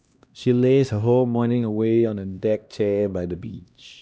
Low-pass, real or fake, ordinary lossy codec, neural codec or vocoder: none; fake; none; codec, 16 kHz, 1 kbps, X-Codec, HuBERT features, trained on LibriSpeech